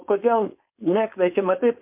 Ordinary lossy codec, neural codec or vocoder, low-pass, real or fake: MP3, 32 kbps; codec, 16 kHz, 4.8 kbps, FACodec; 3.6 kHz; fake